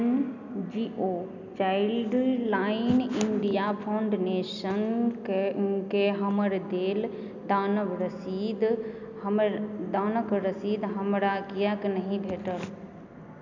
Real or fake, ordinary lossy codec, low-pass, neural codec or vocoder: real; none; 7.2 kHz; none